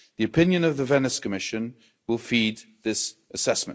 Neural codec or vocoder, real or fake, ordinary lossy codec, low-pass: none; real; none; none